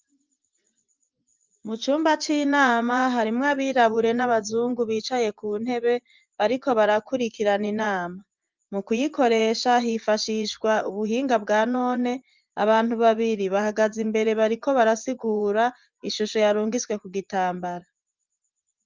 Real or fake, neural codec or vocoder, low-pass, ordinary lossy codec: fake; vocoder, 24 kHz, 100 mel bands, Vocos; 7.2 kHz; Opus, 24 kbps